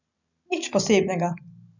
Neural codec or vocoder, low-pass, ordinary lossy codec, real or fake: none; 7.2 kHz; none; real